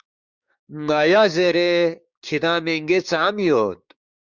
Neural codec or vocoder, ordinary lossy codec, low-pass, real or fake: codec, 16 kHz, 6 kbps, DAC; Opus, 64 kbps; 7.2 kHz; fake